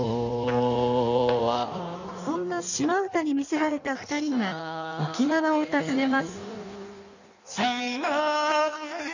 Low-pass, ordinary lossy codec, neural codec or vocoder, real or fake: 7.2 kHz; none; codec, 16 kHz in and 24 kHz out, 1.1 kbps, FireRedTTS-2 codec; fake